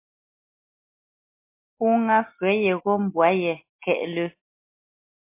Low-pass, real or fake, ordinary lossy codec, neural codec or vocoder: 3.6 kHz; real; MP3, 24 kbps; none